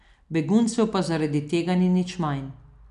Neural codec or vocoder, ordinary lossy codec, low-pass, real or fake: none; none; 10.8 kHz; real